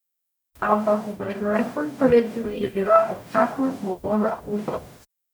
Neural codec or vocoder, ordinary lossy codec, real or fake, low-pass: codec, 44.1 kHz, 0.9 kbps, DAC; none; fake; none